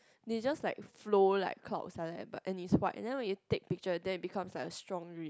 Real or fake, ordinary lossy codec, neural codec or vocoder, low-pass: real; none; none; none